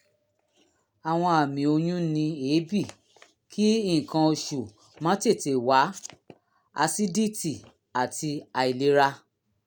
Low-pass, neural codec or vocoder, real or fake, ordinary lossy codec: none; none; real; none